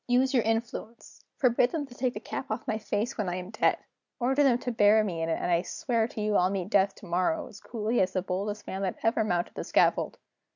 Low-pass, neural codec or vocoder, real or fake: 7.2 kHz; none; real